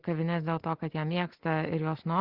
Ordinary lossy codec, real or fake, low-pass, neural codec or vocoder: Opus, 16 kbps; real; 5.4 kHz; none